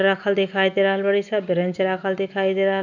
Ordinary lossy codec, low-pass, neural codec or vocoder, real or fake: none; 7.2 kHz; none; real